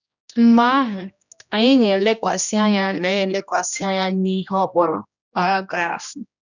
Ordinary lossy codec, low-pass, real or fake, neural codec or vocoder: none; 7.2 kHz; fake; codec, 16 kHz, 1 kbps, X-Codec, HuBERT features, trained on general audio